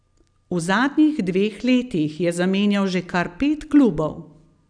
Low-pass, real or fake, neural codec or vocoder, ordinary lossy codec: 9.9 kHz; real; none; none